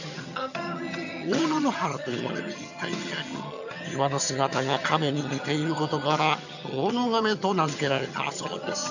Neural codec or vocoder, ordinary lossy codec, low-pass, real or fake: vocoder, 22.05 kHz, 80 mel bands, HiFi-GAN; none; 7.2 kHz; fake